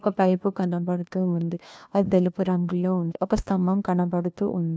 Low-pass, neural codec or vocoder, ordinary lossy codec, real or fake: none; codec, 16 kHz, 1 kbps, FunCodec, trained on LibriTTS, 50 frames a second; none; fake